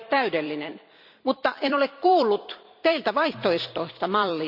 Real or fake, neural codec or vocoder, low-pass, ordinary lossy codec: fake; vocoder, 44.1 kHz, 128 mel bands every 256 samples, BigVGAN v2; 5.4 kHz; none